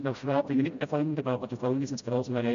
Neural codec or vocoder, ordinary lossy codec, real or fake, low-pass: codec, 16 kHz, 0.5 kbps, FreqCodec, smaller model; MP3, 64 kbps; fake; 7.2 kHz